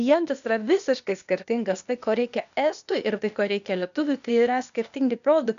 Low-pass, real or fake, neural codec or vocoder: 7.2 kHz; fake; codec, 16 kHz, 0.8 kbps, ZipCodec